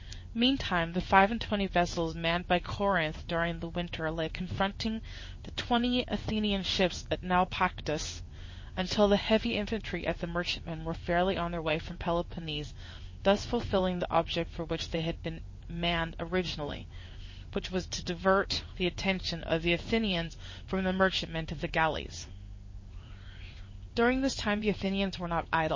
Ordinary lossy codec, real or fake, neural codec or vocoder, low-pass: MP3, 32 kbps; fake; codec, 16 kHz in and 24 kHz out, 1 kbps, XY-Tokenizer; 7.2 kHz